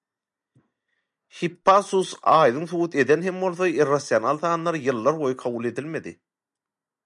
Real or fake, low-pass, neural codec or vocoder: real; 10.8 kHz; none